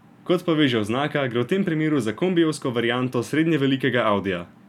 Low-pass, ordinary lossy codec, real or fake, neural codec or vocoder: 19.8 kHz; none; real; none